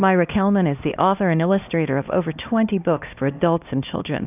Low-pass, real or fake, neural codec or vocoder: 3.6 kHz; fake; codec, 16 kHz, 1 kbps, X-Codec, HuBERT features, trained on LibriSpeech